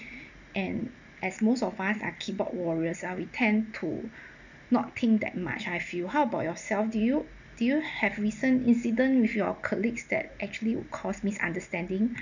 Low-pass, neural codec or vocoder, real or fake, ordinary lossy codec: 7.2 kHz; none; real; none